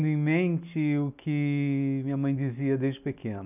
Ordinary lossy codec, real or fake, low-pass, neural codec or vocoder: none; real; 3.6 kHz; none